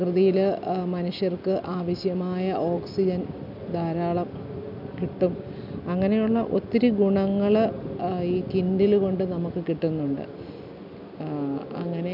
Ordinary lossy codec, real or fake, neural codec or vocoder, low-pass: none; real; none; 5.4 kHz